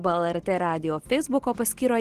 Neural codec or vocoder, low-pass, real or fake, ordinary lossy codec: none; 14.4 kHz; real; Opus, 16 kbps